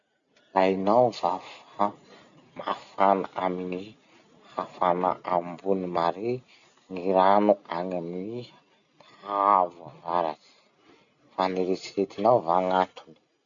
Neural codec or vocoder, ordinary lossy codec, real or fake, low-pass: none; none; real; 7.2 kHz